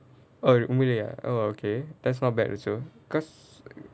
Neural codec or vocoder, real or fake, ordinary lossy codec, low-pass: none; real; none; none